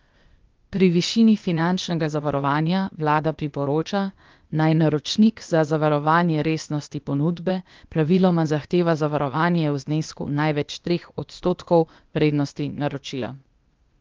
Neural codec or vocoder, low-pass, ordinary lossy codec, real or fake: codec, 16 kHz, 0.8 kbps, ZipCodec; 7.2 kHz; Opus, 24 kbps; fake